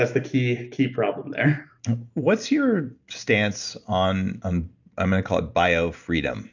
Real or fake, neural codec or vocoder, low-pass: real; none; 7.2 kHz